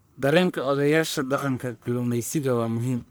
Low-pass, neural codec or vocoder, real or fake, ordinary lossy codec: none; codec, 44.1 kHz, 1.7 kbps, Pupu-Codec; fake; none